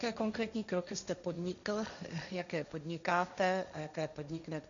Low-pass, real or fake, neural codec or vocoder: 7.2 kHz; fake; codec, 16 kHz, 1.1 kbps, Voila-Tokenizer